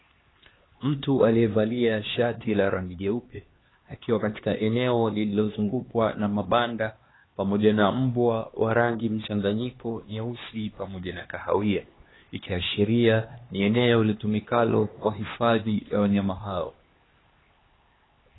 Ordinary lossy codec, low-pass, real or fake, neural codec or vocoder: AAC, 16 kbps; 7.2 kHz; fake; codec, 16 kHz, 2 kbps, X-Codec, HuBERT features, trained on LibriSpeech